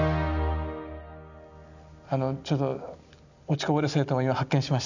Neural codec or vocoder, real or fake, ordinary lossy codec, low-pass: none; real; none; 7.2 kHz